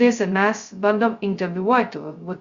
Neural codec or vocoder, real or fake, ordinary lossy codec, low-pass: codec, 16 kHz, 0.2 kbps, FocalCodec; fake; Opus, 64 kbps; 7.2 kHz